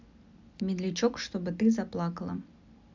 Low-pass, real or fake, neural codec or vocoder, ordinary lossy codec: 7.2 kHz; real; none; MP3, 64 kbps